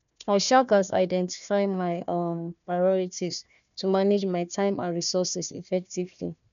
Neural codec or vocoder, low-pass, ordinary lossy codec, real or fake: codec, 16 kHz, 1 kbps, FunCodec, trained on Chinese and English, 50 frames a second; 7.2 kHz; none; fake